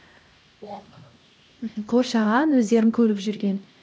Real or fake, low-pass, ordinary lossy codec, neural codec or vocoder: fake; none; none; codec, 16 kHz, 1 kbps, X-Codec, HuBERT features, trained on LibriSpeech